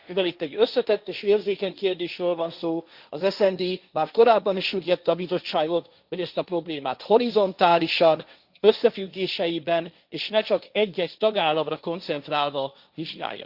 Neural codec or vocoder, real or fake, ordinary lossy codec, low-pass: codec, 16 kHz, 1.1 kbps, Voila-Tokenizer; fake; Opus, 64 kbps; 5.4 kHz